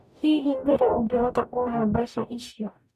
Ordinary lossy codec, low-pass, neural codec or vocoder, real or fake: none; 14.4 kHz; codec, 44.1 kHz, 0.9 kbps, DAC; fake